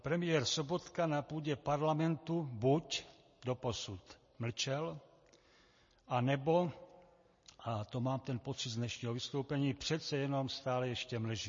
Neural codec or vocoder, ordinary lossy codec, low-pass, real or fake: none; MP3, 32 kbps; 7.2 kHz; real